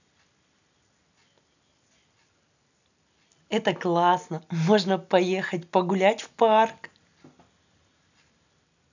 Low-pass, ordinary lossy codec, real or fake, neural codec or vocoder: 7.2 kHz; none; real; none